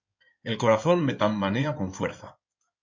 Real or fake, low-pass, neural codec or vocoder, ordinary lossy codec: fake; 7.2 kHz; codec, 16 kHz in and 24 kHz out, 2.2 kbps, FireRedTTS-2 codec; MP3, 48 kbps